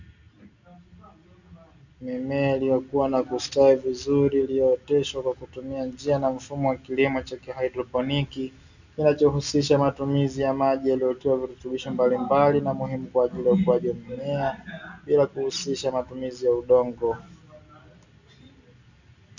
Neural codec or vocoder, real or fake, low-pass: none; real; 7.2 kHz